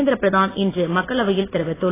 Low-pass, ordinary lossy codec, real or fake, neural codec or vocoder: 3.6 kHz; AAC, 16 kbps; real; none